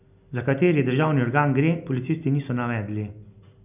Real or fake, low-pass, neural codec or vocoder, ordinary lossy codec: real; 3.6 kHz; none; none